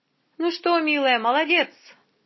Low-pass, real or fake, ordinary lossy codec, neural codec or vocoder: 7.2 kHz; real; MP3, 24 kbps; none